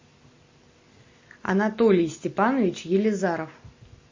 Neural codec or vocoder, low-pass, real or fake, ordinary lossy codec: none; 7.2 kHz; real; MP3, 32 kbps